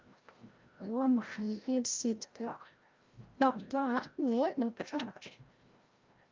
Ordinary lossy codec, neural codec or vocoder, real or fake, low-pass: Opus, 32 kbps; codec, 16 kHz, 0.5 kbps, FreqCodec, larger model; fake; 7.2 kHz